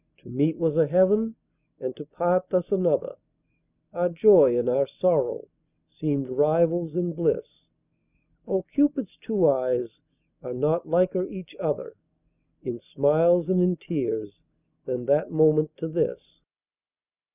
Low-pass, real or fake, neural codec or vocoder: 3.6 kHz; real; none